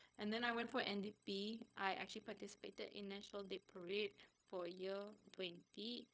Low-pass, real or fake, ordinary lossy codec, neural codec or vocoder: none; fake; none; codec, 16 kHz, 0.4 kbps, LongCat-Audio-Codec